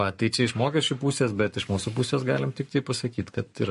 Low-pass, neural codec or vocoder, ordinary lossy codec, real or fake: 14.4 kHz; codec, 44.1 kHz, 7.8 kbps, Pupu-Codec; MP3, 48 kbps; fake